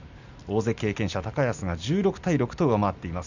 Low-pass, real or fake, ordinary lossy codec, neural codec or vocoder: 7.2 kHz; real; none; none